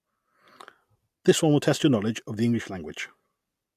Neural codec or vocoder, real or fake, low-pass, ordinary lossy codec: none; real; 14.4 kHz; AAC, 96 kbps